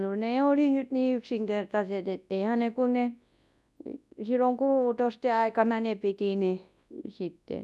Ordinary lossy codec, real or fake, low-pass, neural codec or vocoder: none; fake; none; codec, 24 kHz, 0.9 kbps, WavTokenizer, large speech release